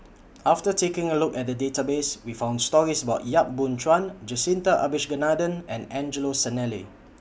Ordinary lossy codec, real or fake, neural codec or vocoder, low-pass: none; real; none; none